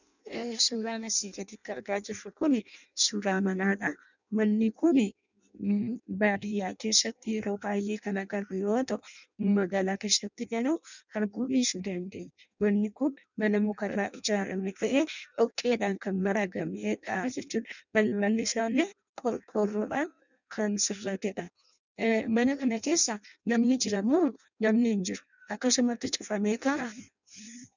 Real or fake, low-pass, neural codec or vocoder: fake; 7.2 kHz; codec, 16 kHz in and 24 kHz out, 0.6 kbps, FireRedTTS-2 codec